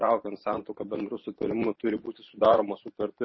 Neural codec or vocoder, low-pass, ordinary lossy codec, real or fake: vocoder, 44.1 kHz, 128 mel bands every 512 samples, BigVGAN v2; 7.2 kHz; MP3, 24 kbps; fake